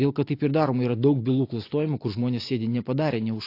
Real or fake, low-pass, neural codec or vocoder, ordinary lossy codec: real; 5.4 kHz; none; AAC, 32 kbps